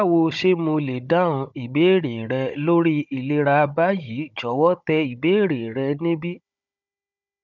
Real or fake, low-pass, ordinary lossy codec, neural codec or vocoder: fake; 7.2 kHz; none; codec, 16 kHz, 16 kbps, FunCodec, trained on Chinese and English, 50 frames a second